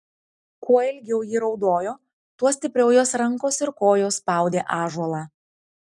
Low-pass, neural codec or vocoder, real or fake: 10.8 kHz; none; real